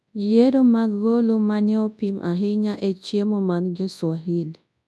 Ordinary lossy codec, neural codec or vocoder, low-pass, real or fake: none; codec, 24 kHz, 0.9 kbps, WavTokenizer, large speech release; none; fake